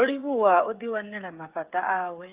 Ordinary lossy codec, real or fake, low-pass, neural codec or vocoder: Opus, 32 kbps; fake; 3.6 kHz; codec, 16 kHz, 8 kbps, FreqCodec, smaller model